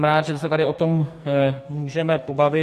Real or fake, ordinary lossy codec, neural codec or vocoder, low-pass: fake; AAC, 64 kbps; codec, 44.1 kHz, 2.6 kbps, SNAC; 14.4 kHz